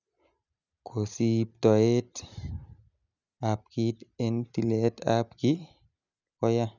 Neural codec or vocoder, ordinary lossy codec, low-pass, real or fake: none; none; 7.2 kHz; real